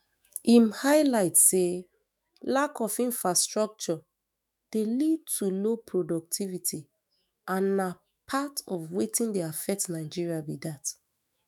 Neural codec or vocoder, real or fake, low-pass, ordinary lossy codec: autoencoder, 48 kHz, 128 numbers a frame, DAC-VAE, trained on Japanese speech; fake; none; none